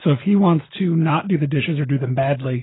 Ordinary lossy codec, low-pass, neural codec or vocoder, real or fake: AAC, 16 kbps; 7.2 kHz; none; real